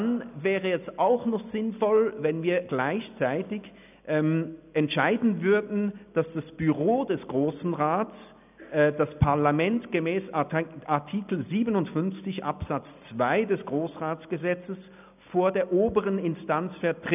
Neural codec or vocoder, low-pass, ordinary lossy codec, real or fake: none; 3.6 kHz; none; real